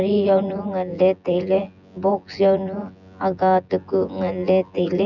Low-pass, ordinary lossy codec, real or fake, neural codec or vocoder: 7.2 kHz; none; fake; vocoder, 24 kHz, 100 mel bands, Vocos